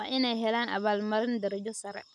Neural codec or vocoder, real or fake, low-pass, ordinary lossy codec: none; real; none; none